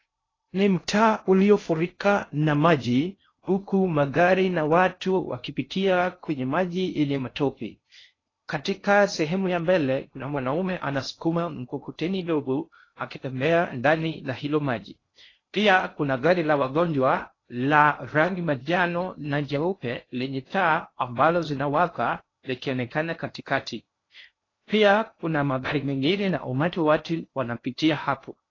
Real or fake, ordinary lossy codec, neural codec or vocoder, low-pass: fake; AAC, 32 kbps; codec, 16 kHz in and 24 kHz out, 0.6 kbps, FocalCodec, streaming, 2048 codes; 7.2 kHz